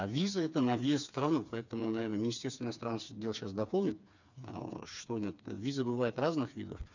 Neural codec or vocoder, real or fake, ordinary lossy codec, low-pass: codec, 16 kHz, 4 kbps, FreqCodec, smaller model; fake; none; 7.2 kHz